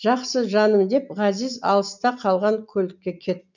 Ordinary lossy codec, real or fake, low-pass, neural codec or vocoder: none; real; 7.2 kHz; none